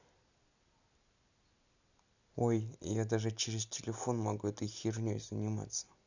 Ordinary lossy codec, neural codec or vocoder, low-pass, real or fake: none; none; 7.2 kHz; real